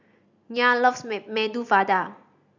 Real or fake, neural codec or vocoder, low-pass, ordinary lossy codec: real; none; 7.2 kHz; none